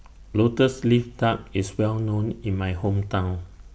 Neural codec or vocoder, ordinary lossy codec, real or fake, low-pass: none; none; real; none